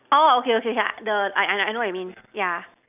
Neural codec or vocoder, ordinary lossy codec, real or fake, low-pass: none; none; real; 3.6 kHz